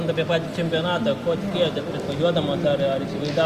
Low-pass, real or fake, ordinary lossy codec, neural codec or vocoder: 14.4 kHz; real; Opus, 32 kbps; none